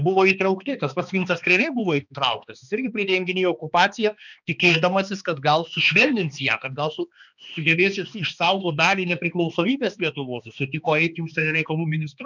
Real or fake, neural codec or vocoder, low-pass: fake; codec, 16 kHz, 2 kbps, X-Codec, HuBERT features, trained on balanced general audio; 7.2 kHz